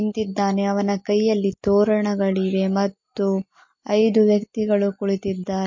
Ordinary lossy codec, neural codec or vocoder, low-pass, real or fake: MP3, 32 kbps; none; 7.2 kHz; real